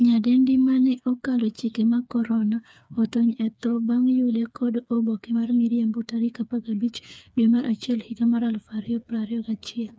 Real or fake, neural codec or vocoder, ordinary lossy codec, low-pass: fake; codec, 16 kHz, 4 kbps, FreqCodec, smaller model; none; none